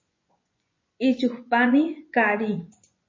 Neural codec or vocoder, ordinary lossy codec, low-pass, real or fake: vocoder, 24 kHz, 100 mel bands, Vocos; MP3, 32 kbps; 7.2 kHz; fake